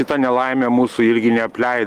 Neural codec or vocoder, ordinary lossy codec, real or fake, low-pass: none; Opus, 24 kbps; real; 14.4 kHz